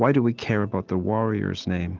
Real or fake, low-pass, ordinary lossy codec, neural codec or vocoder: real; 7.2 kHz; Opus, 16 kbps; none